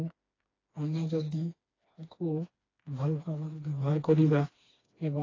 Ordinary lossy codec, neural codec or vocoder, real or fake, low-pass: AAC, 32 kbps; codec, 16 kHz, 2 kbps, FreqCodec, smaller model; fake; 7.2 kHz